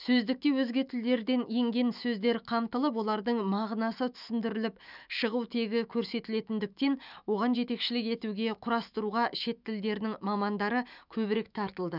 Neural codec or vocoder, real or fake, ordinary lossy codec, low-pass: autoencoder, 48 kHz, 128 numbers a frame, DAC-VAE, trained on Japanese speech; fake; none; 5.4 kHz